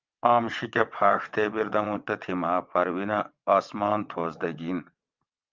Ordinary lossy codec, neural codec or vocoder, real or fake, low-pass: Opus, 24 kbps; vocoder, 22.05 kHz, 80 mel bands, WaveNeXt; fake; 7.2 kHz